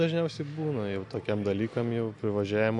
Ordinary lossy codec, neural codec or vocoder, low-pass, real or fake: AAC, 64 kbps; none; 10.8 kHz; real